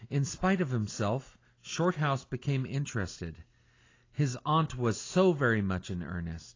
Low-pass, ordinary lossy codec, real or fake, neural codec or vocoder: 7.2 kHz; AAC, 32 kbps; real; none